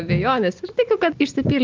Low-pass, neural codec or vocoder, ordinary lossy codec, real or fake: 7.2 kHz; none; Opus, 24 kbps; real